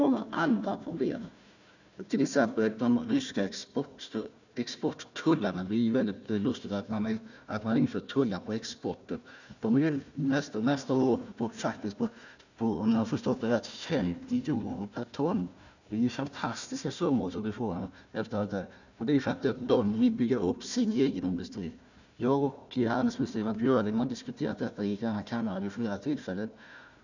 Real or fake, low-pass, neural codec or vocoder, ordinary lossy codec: fake; 7.2 kHz; codec, 16 kHz, 1 kbps, FunCodec, trained on Chinese and English, 50 frames a second; none